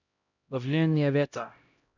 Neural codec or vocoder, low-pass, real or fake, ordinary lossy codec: codec, 16 kHz, 0.5 kbps, X-Codec, HuBERT features, trained on LibriSpeech; 7.2 kHz; fake; none